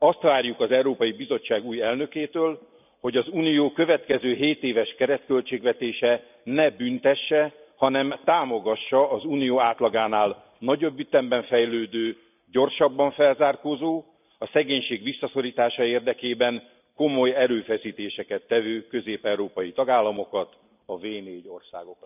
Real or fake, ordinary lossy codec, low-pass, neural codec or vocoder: real; none; 3.6 kHz; none